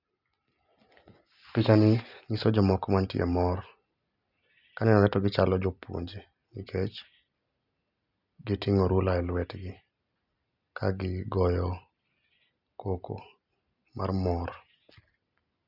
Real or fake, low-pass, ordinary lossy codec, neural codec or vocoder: real; 5.4 kHz; none; none